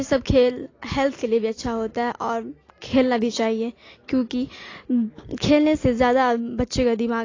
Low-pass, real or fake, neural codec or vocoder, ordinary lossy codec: 7.2 kHz; real; none; AAC, 32 kbps